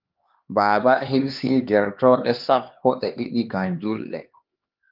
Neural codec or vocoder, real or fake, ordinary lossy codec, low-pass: codec, 16 kHz, 2 kbps, X-Codec, HuBERT features, trained on LibriSpeech; fake; Opus, 32 kbps; 5.4 kHz